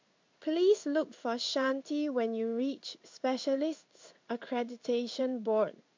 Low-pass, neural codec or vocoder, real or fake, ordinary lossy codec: 7.2 kHz; codec, 16 kHz in and 24 kHz out, 1 kbps, XY-Tokenizer; fake; MP3, 64 kbps